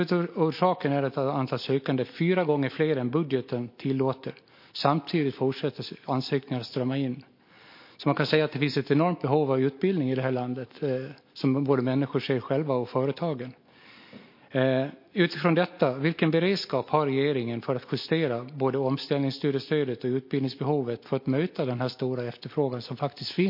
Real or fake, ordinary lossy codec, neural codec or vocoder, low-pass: real; MP3, 32 kbps; none; 5.4 kHz